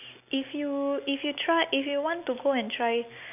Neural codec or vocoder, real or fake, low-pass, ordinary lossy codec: none; real; 3.6 kHz; none